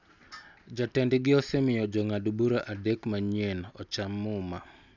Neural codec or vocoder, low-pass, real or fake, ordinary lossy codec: none; 7.2 kHz; real; none